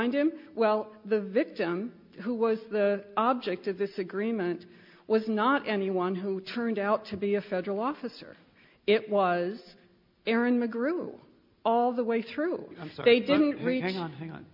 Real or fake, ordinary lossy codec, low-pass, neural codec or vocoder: real; MP3, 48 kbps; 5.4 kHz; none